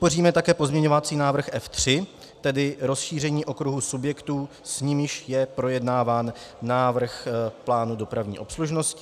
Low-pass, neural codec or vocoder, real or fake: 14.4 kHz; none; real